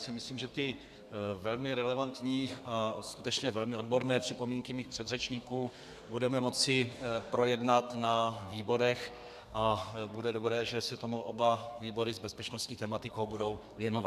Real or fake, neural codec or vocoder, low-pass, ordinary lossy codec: fake; codec, 32 kHz, 1.9 kbps, SNAC; 14.4 kHz; AAC, 96 kbps